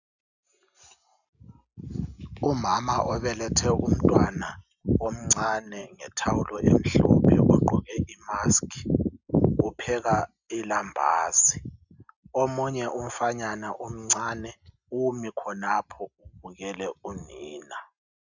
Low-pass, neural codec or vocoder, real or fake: 7.2 kHz; none; real